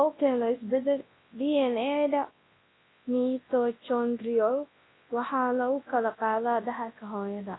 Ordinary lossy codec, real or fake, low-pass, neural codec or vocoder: AAC, 16 kbps; fake; 7.2 kHz; codec, 24 kHz, 0.9 kbps, WavTokenizer, large speech release